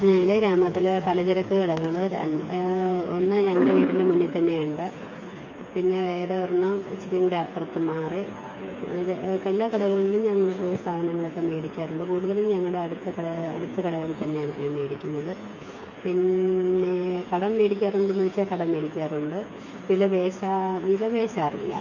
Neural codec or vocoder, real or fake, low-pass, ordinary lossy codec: codec, 16 kHz, 4 kbps, FreqCodec, smaller model; fake; 7.2 kHz; MP3, 48 kbps